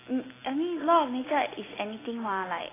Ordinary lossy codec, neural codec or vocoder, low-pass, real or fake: AAC, 16 kbps; none; 3.6 kHz; real